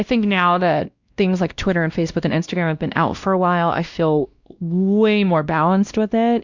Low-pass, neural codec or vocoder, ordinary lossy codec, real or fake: 7.2 kHz; codec, 16 kHz, 1 kbps, X-Codec, WavLM features, trained on Multilingual LibriSpeech; Opus, 64 kbps; fake